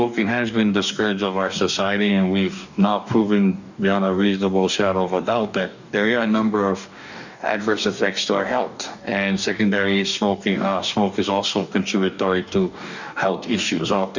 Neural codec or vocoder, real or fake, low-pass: codec, 44.1 kHz, 2.6 kbps, DAC; fake; 7.2 kHz